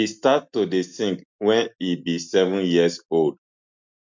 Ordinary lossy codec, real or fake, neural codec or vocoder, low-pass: MP3, 64 kbps; real; none; 7.2 kHz